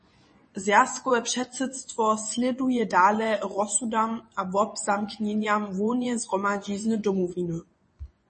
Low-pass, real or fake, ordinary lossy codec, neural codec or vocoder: 10.8 kHz; fake; MP3, 32 kbps; vocoder, 24 kHz, 100 mel bands, Vocos